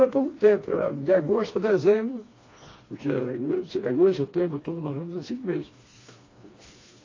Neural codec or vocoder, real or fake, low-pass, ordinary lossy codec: codec, 16 kHz, 2 kbps, FreqCodec, smaller model; fake; 7.2 kHz; AAC, 32 kbps